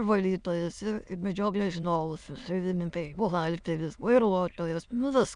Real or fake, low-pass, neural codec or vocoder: fake; 9.9 kHz; autoencoder, 22.05 kHz, a latent of 192 numbers a frame, VITS, trained on many speakers